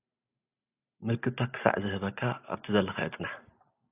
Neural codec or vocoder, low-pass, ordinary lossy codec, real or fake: none; 3.6 kHz; AAC, 32 kbps; real